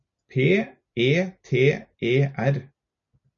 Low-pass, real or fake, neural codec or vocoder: 7.2 kHz; real; none